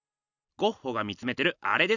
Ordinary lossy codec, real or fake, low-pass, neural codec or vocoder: none; real; 7.2 kHz; none